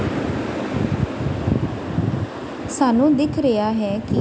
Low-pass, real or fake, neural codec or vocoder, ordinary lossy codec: none; real; none; none